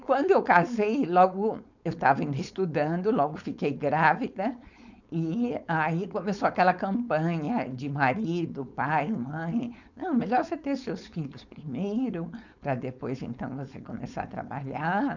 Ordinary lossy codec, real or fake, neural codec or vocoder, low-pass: none; fake; codec, 16 kHz, 4.8 kbps, FACodec; 7.2 kHz